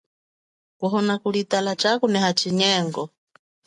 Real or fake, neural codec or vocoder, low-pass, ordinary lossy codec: real; none; 10.8 kHz; AAC, 64 kbps